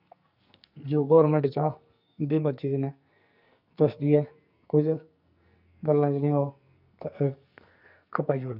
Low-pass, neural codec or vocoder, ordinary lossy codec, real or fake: 5.4 kHz; codec, 44.1 kHz, 2.6 kbps, SNAC; none; fake